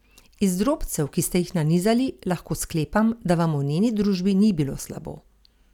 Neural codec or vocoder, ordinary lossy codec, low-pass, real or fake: none; none; 19.8 kHz; real